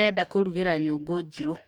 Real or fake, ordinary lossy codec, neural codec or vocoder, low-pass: fake; none; codec, 44.1 kHz, 2.6 kbps, DAC; 19.8 kHz